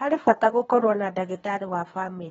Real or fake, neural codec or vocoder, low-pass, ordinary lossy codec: fake; codec, 24 kHz, 3 kbps, HILCodec; 10.8 kHz; AAC, 24 kbps